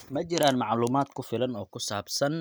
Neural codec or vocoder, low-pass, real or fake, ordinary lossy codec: none; none; real; none